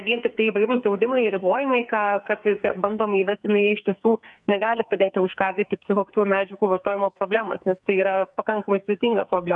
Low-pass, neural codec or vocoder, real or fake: 10.8 kHz; codec, 44.1 kHz, 2.6 kbps, SNAC; fake